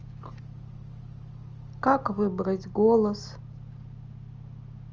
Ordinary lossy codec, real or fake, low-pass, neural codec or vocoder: Opus, 24 kbps; real; 7.2 kHz; none